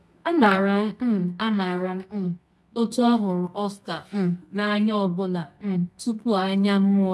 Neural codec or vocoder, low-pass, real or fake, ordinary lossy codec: codec, 24 kHz, 0.9 kbps, WavTokenizer, medium music audio release; none; fake; none